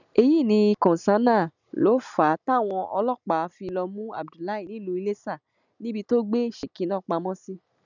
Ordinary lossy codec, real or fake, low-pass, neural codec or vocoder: none; real; 7.2 kHz; none